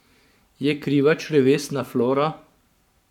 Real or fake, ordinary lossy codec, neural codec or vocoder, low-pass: fake; none; codec, 44.1 kHz, 7.8 kbps, Pupu-Codec; 19.8 kHz